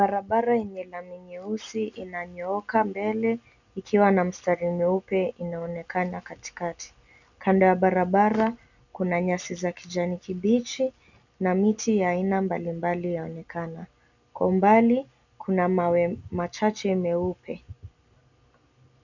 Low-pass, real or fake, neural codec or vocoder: 7.2 kHz; real; none